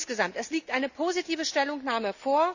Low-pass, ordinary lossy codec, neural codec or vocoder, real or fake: 7.2 kHz; none; none; real